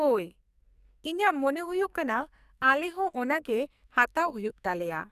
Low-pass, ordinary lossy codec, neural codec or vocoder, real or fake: 14.4 kHz; none; codec, 44.1 kHz, 2.6 kbps, SNAC; fake